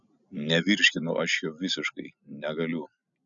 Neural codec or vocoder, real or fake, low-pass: none; real; 7.2 kHz